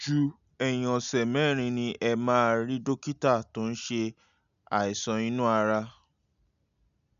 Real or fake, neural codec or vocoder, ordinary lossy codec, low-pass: real; none; none; 7.2 kHz